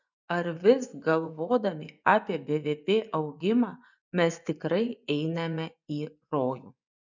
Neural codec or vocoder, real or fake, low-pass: vocoder, 24 kHz, 100 mel bands, Vocos; fake; 7.2 kHz